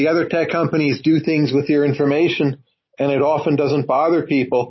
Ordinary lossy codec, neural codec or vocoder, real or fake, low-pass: MP3, 24 kbps; none; real; 7.2 kHz